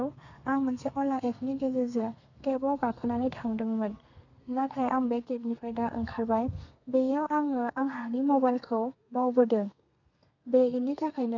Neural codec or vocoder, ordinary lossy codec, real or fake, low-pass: codec, 32 kHz, 1.9 kbps, SNAC; none; fake; 7.2 kHz